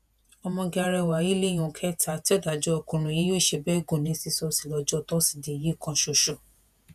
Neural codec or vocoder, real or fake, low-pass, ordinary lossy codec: vocoder, 48 kHz, 128 mel bands, Vocos; fake; 14.4 kHz; none